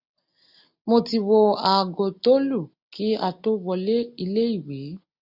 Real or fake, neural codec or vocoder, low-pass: real; none; 5.4 kHz